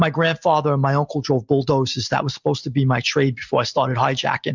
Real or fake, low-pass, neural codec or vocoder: real; 7.2 kHz; none